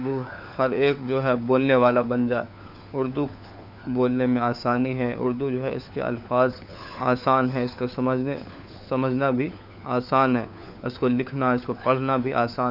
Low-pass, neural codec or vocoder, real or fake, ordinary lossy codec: 5.4 kHz; codec, 16 kHz, 4 kbps, FunCodec, trained on LibriTTS, 50 frames a second; fake; none